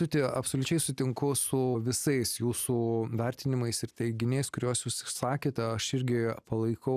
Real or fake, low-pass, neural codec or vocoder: real; 14.4 kHz; none